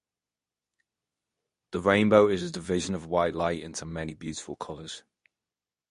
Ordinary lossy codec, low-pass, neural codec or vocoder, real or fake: MP3, 48 kbps; 10.8 kHz; codec, 24 kHz, 0.9 kbps, WavTokenizer, medium speech release version 2; fake